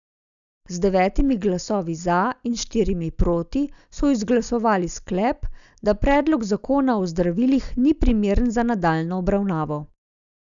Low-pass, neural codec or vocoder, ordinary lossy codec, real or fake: 7.2 kHz; none; none; real